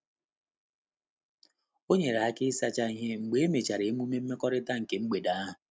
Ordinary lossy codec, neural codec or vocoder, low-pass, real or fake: none; none; none; real